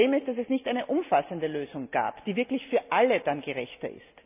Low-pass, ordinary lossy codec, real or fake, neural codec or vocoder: 3.6 kHz; none; real; none